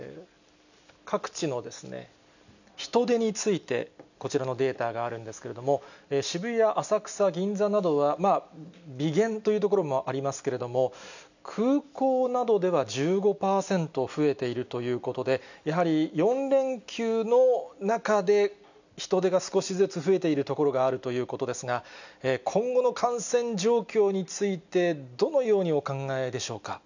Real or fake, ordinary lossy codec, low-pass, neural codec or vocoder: real; none; 7.2 kHz; none